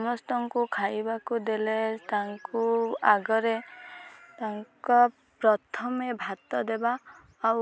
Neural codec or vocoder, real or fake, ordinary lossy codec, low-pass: none; real; none; none